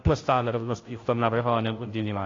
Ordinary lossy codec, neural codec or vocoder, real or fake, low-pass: AAC, 32 kbps; codec, 16 kHz, 0.5 kbps, FunCodec, trained on Chinese and English, 25 frames a second; fake; 7.2 kHz